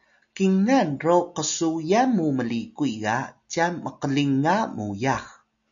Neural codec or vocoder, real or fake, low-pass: none; real; 7.2 kHz